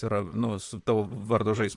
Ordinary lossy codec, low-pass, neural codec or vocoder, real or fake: MP3, 64 kbps; 10.8 kHz; vocoder, 44.1 kHz, 128 mel bands, Pupu-Vocoder; fake